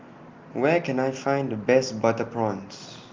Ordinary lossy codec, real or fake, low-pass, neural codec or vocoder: Opus, 24 kbps; real; 7.2 kHz; none